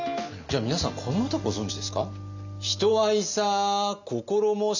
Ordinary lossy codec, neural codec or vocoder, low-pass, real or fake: none; none; 7.2 kHz; real